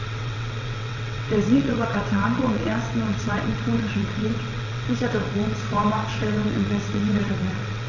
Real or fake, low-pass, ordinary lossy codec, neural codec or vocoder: fake; 7.2 kHz; none; vocoder, 22.05 kHz, 80 mel bands, WaveNeXt